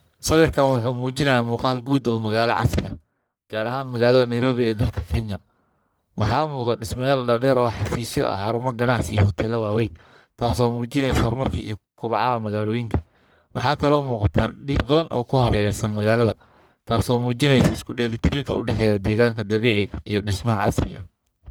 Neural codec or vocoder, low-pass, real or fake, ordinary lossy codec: codec, 44.1 kHz, 1.7 kbps, Pupu-Codec; none; fake; none